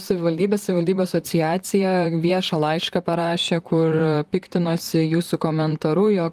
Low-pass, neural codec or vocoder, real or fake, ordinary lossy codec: 14.4 kHz; vocoder, 44.1 kHz, 128 mel bands, Pupu-Vocoder; fake; Opus, 32 kbps